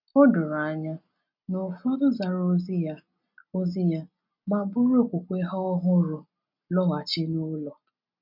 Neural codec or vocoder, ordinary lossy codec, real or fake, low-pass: none; none; real; 5.4 kHz